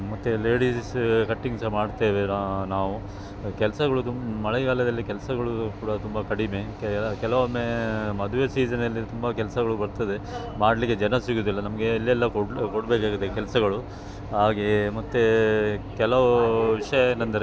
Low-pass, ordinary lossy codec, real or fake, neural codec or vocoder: none; none; real; none